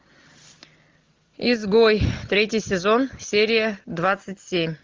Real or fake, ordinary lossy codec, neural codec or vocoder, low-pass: real; Opus, 32 kbps; none; 7.2 kHz